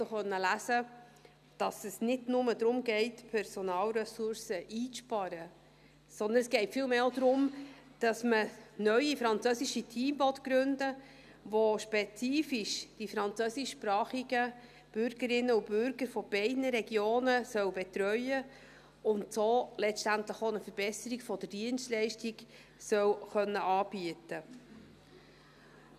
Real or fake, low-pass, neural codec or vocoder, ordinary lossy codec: real; 14.4 kHz; none; none